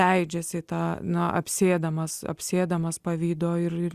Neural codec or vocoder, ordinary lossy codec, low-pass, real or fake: none; Opus, 64 kbps; 14.4 kHz; real